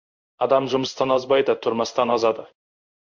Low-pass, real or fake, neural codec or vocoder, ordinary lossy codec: 7.2 kHz; fake; codec, 16 kHz in and 24 kHz out, 1 kbps, XY-Tokenizer; MP3, 64 kbps